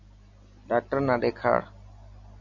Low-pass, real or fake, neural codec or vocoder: 7.2 kHz; real; none